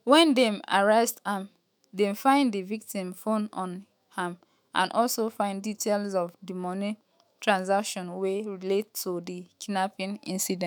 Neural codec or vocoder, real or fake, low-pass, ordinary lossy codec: autoencoder, 48 kHz, 128 numbers a frame, DAC-VAE, trained on Japanese speech; fake; none; none